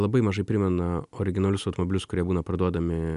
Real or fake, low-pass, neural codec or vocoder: real; 10.8 kHz; none